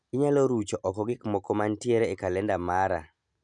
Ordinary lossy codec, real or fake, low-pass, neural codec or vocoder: none; real; 9.9 kHz; none